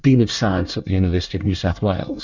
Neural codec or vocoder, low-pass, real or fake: codec, 24 kHz, 1 kbps, SNAC; 7.2 kHz; fake